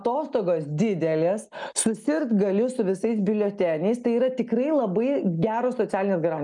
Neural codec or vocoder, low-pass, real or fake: none; 10.8 kHz; real